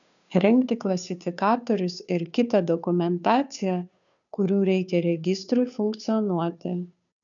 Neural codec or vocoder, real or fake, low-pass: codec, 16 kHz, 2 kbps, FunCodec, trained on Chinese and English, 25 frames a second; fake; 7.2 kHz